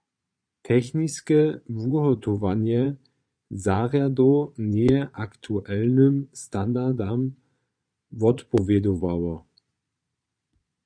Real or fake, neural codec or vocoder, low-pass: fake; vocoder, 22.05 kHz, 80 mel bands, Vocos; 9.9 kHz